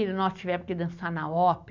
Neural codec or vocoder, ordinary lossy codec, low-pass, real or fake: none; none; 7.2 kHz; real